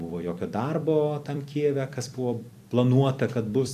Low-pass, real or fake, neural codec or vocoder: 14.4 kHz; fake; vocoder, 44.1 kHz, 128 mel bands every 256 samples, BigVGAN v2